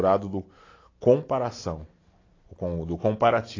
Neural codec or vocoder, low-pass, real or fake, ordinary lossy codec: none; 7.2 kHz; real; AAC, 32 kbps